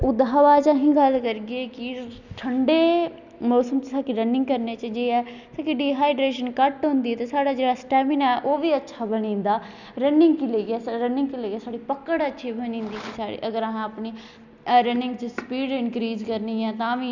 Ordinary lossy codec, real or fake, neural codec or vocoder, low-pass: none; real; none; 7.2 kHz